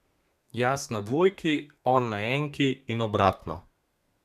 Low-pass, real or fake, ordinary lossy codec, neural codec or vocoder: 14.4 kHz; fake; none; codec, 32 kHz, 1.9 kbps, SNAC